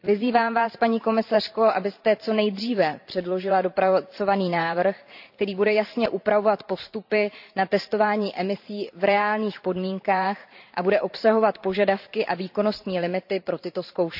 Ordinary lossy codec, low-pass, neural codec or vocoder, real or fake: none; 5.4 kHz; vocoder, 44.1 kHz, 128 mel bands every 512 samples, BigVGAN v2; fake